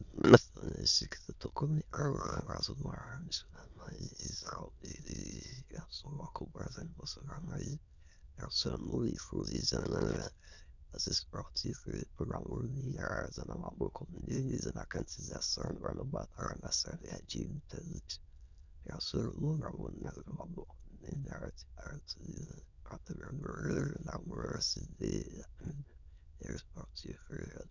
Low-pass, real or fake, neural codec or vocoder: 7.2 kHz; fake; autoencoder, 22.05 kHz, a latent of 192 numbers a frame, VITS, trained on many speakers